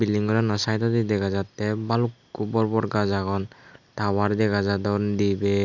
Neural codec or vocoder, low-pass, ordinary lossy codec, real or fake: none; 7.2 kHz; none; real